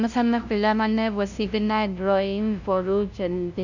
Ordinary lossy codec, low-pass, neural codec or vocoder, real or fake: none; 7.2 kHz; codec, 16 kHz, 0.5 kbps, FunCodec, trained on LibriTTS, 25 frames a second; fake